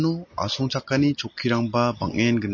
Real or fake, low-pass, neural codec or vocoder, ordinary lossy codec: real; 7.2 kHz; none; MP3, 32 kbps